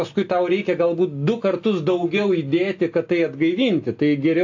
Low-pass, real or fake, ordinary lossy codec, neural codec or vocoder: 7.2 kHz; real; AAC, 48 kbps; none